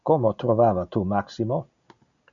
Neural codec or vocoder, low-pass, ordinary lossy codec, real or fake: none; 7.2 kHz; MP3, 96 kbps; real